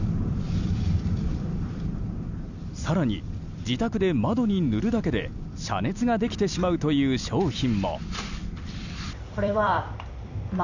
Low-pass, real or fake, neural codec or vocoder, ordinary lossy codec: 7.2 kHz; fake; vocoder, 44.1 kHz, 128 mel bands every 256 samples, BigVGAN v2; none